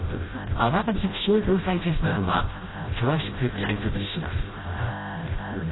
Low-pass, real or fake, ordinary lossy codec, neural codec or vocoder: 7.2 kHz; fake; AAC, 16 kbps; codec, 16 kHz, 0.5 kbps, FreqCodec, smaller model